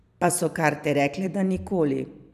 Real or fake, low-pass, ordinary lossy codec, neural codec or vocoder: fake; 14.4 kHz; none; vocoder, 44.1 kHz, 128 mel bands every 256 samples, BigVGAN v2